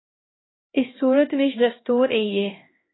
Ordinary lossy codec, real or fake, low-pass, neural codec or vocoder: AAC, 16 kbps; fake; 7.2 kHz; codec, 16 kHz, 1 kbps, X-Codec, HuBERT features, trained on LibriSpeech